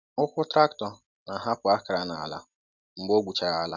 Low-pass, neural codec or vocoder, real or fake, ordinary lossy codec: 7.2 kHz; none; real; none